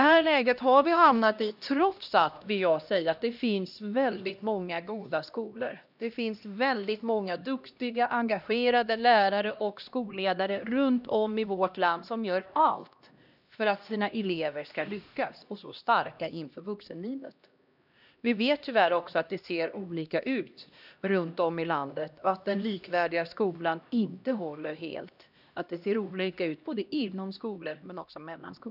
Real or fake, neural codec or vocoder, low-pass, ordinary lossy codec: fake; codec, 16 kHz, 1 kbps, X-Codec, HuBERT features, trained on LibriSpeech; 5.4 kHz; none